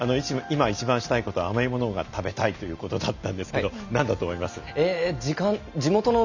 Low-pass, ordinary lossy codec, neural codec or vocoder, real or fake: 7.2 kHz; none; none; real